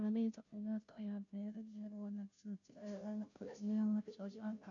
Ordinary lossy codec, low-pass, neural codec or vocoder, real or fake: none; 7.2 kHz; codec, 16 kHz, 0.5 kbps, FunCodec, trained on Chinese and English, 25 frames a second; fake